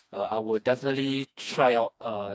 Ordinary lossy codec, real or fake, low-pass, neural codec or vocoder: none; fake; none; codec, 16 kHz, 2 kbps, FreqCodec, smaller model